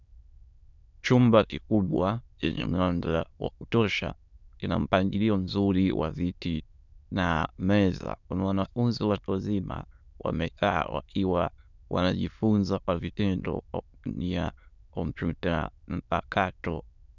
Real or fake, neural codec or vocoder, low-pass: fake; autoencoder, 22.05 kHz, a latent of 192 numbers a frame, VITS, trained on many speakers; 7.2 kHz